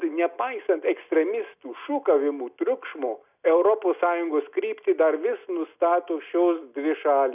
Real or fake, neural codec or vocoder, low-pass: real; none; 3.6 kHz